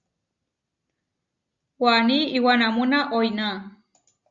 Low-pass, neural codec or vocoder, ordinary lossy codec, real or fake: 7.2 kHz; none; Opus, 64 kbps; real